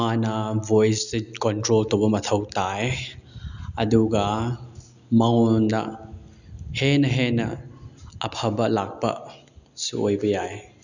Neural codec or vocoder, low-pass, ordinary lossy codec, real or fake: none; 7.2 kHz; none; real